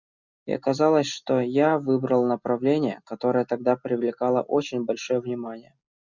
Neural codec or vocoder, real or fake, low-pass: none; real; 7.2 kHz